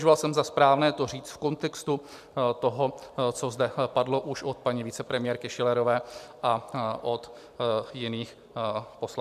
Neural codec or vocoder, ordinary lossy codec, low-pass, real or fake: none; MP3, 96 kbps; 14.4 kHz; real